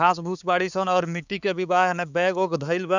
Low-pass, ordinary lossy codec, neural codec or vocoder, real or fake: 7.2 kHz; none; codec, 16 kHz, 4 kbps, X-Codec, HuBERT features, trained on balanced general audio; fake